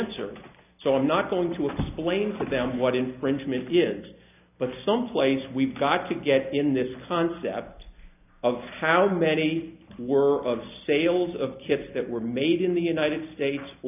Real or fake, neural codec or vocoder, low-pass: real; none; 3.6 kHz